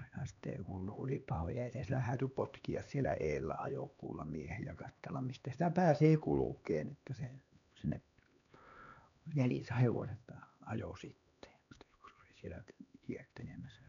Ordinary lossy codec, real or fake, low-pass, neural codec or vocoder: none; fake; 7.2 kHz; codec, 16 kHz, 2 kbps, X-Codec, HuBERT features, trained on LibriSpeech